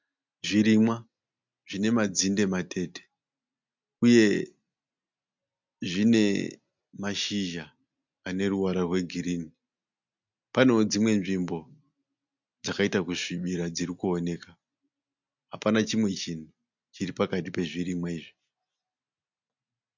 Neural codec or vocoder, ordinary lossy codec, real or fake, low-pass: none; MP3, 64 kbps; real; 7.2 kHz